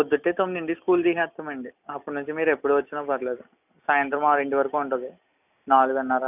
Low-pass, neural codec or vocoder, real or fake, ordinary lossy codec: 3.6 kHz; none; real; none